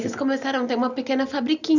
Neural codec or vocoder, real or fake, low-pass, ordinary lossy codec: none; real; 7.2 kHz; none